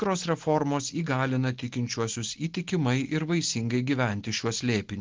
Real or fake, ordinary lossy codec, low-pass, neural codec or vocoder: real; Opus, 16 kbps; 7.2 kHz; none